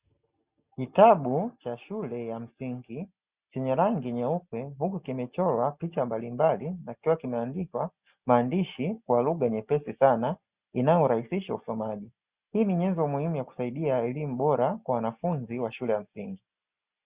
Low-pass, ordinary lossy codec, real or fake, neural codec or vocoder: 3.6 kHz; Opus, 64 kbps; real; none